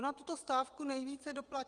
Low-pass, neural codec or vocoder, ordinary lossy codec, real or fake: 9.9 kHz; vocoder, 22.05 kHz, 80 mel bands, WaveNeXt; Opus, 24 kbps; fake